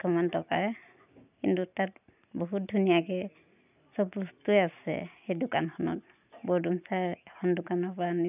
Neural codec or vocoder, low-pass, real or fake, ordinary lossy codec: none; 3.6 kHz; real; none